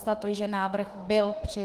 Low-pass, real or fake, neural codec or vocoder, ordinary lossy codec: 14.4 kHz; fake; autoencoder, 48 kHz, 32 numbers a frame, DAC-VAE, trained on Japanese speech; Opus, 24 kbps